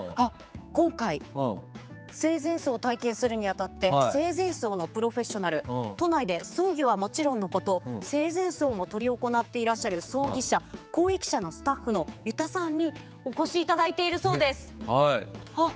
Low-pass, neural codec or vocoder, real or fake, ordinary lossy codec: none; codec, 16 kHz, 4 kbps, X-Codec, HuBERT features, trained on general audio; fake; none